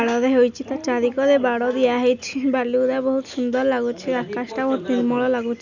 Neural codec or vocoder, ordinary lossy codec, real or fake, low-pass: none; none; real; 7.2 kHz